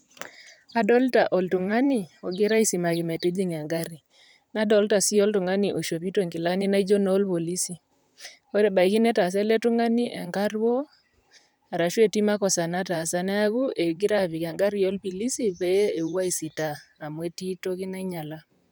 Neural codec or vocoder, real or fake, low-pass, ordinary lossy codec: vocoder, 44.1 kHz, 128 mel bands, Pupu-Vocoder; fake; none; none